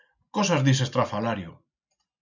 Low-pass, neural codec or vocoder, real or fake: 7.2 kHz; none; real